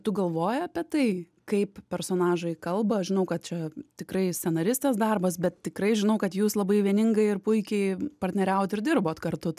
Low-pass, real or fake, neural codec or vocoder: 14.4 kHz; real; none